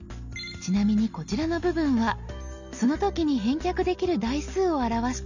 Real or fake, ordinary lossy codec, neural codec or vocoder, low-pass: real; none; none; 7.2 kHz